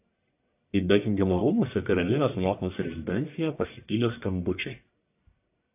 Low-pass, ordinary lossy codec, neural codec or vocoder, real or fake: 3.6 kHz; AAC, 32 kbps; codec, 44.1 kHz, 1.7 kbps, Pupu-Codec; fake